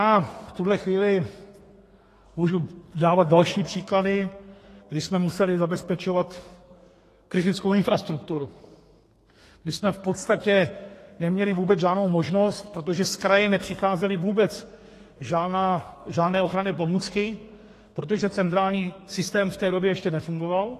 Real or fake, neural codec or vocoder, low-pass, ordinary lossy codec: fake; codec, 32 kHz, 1.9 kbps, SNAC; 14.4 kHz; AAC, 48 kbps